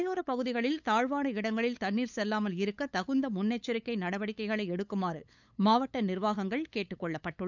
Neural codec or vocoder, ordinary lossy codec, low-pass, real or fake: codec, 16 kHz, 8 kbps, FunCodec, trained on LibriTTS, 25 frames a second; none; 7.2 kHz; fake